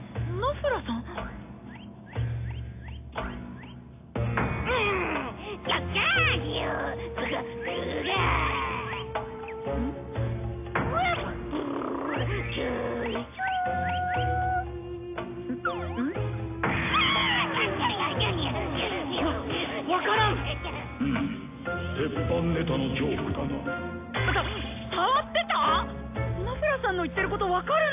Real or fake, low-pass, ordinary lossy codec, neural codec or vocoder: real; 3.6 kHz; AAC, 24 kbps; none